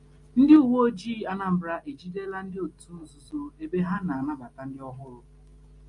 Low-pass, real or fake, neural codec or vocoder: 10.8 kHz; real; none